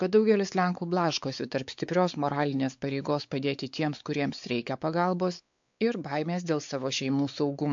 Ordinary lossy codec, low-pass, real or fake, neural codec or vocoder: AAC, 64 kbps; 7.2 kHz; fake; codec, 16 kHz, 4 kbps, X-Codec, WavLM features, trained on Multilingual LibriSpeech